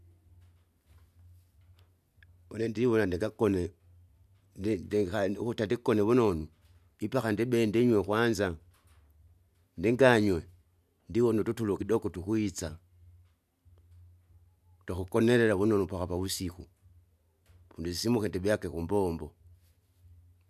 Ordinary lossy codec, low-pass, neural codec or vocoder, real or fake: none; 14.4 kHz; none; real